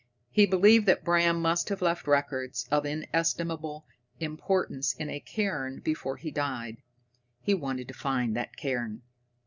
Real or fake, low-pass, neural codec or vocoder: real; 7.2 kHz; none